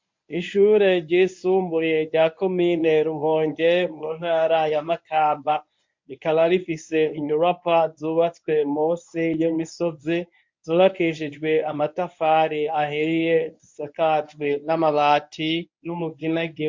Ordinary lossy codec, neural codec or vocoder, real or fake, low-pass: MP3, 48 kbps; codec, 24 kHz, 0.9 kbps, WavTokenizer, medium speech release version 1; fake; 7.2 kHz